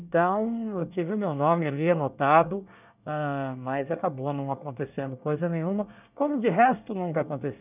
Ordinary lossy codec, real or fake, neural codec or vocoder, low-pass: none; fake; codec, 24 kHz, 1 kbps, SNAC; 3.6 kHz